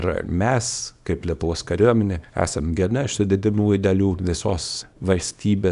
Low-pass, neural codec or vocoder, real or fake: 10.8 kHz; codec, 24 kHz, 0.9 kbps, WavTokenizer, small release; fake